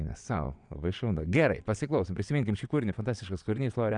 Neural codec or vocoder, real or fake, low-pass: vocoder, 22.05 kHz, 80 mel bands, WaveNeXt; fake; 9.9 kHz